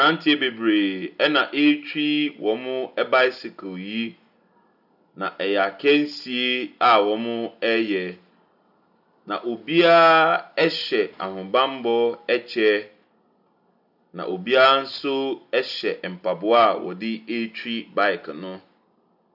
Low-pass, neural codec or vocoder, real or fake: 5.4 kHz; none; real